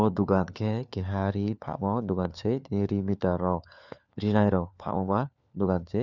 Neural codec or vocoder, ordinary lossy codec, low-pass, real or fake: codec, 16 kHz, 4 kbps, FunCodec, trained on LibriTTS, 50 frames a second; none; 7.2 kHz; fake